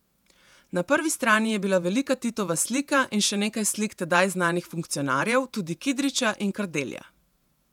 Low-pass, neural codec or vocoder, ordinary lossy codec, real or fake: 19.8 kHz; vocoder, 48 kHz, 128 mel bands, Vocos; none; fake